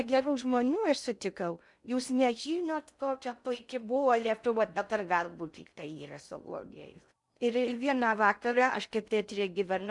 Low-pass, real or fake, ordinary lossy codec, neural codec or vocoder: 10.8 kHz; fake; MP3, 96 kbps; codec, 16 kHz in and 24 kHz out, 0.6 kbps, FocalCodec, streaming, 2048 codes